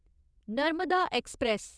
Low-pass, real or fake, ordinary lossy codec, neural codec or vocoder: none; fake; none; vocoder, 22.05 kHz, 80 mel bands, WaveNeXt